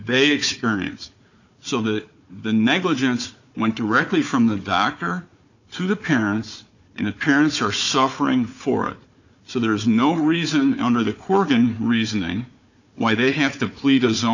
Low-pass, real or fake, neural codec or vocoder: 7.2 kHz; fake; codec, 16 kHz, 4 kbps, FunCodec, trained on Chinese and English, 50 frames a second